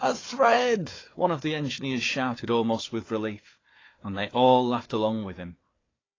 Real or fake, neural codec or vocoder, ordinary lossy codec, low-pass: fake; codec, 16 kHz, 4 kbps, FunCodec, trained on Chinese and English, 50 frames a second; AAC, 32 kbps; 7.2 kHz